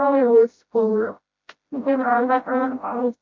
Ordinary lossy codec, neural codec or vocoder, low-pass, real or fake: MP3, 48 kbps; codec, 16 kHz, 0.5 kbps, FreqCodec, smaller model; 7.2 kHz; fake